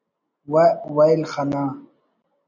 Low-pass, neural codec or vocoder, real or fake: 7.2 kHz; none; real